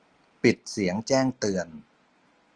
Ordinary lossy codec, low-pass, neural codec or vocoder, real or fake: Opus, 24 kbps; 9.9 kHz; none; real